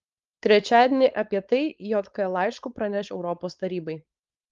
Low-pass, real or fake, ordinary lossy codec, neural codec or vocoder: 7.2 kHz; fake; Opus, 32 kbps; codec, 16 kHz, 4.8 kbps, FACodec